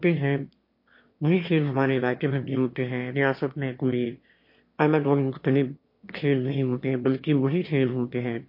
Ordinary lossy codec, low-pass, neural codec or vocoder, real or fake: MP3, 32 kbps; 5.4 kHz; autoencoder, 22.05 kHz, a latent of 192 numbers a frame, VITS, trained on one speaker; fake